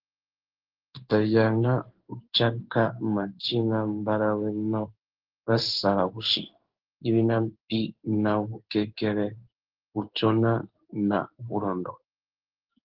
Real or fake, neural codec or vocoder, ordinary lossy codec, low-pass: fake; codec, 16 kHz in and 24 kHz out, 1 kbps, XY-Tokenizer; Opus, 16 kbps; 5.4 kHz